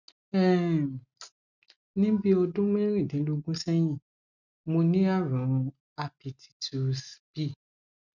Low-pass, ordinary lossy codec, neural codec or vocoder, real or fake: 7.2 kHz; none; none; real